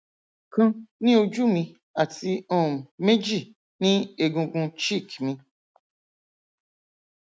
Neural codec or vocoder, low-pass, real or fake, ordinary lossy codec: none; none; real; none